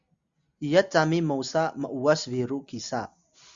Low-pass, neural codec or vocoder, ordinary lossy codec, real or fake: 7.2 kHz; none; Opus, 64 kbps; real